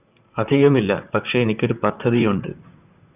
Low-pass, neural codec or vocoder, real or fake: 3.6 kHz; vocoder, 44.1 kHz, 128 mel bands, Pupu-Vocoder; fake